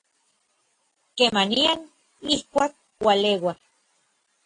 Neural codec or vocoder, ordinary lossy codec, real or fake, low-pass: none; AAC, 32 kbps; real; 9.9 kHz